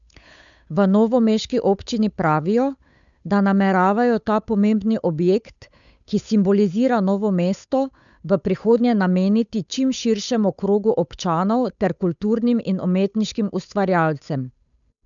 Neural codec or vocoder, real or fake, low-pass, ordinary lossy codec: codec, 16 kHz, 8 kbps, FunCodec, trained on Chinese and English, 25 frames a second; fake; 7.2 kHz; none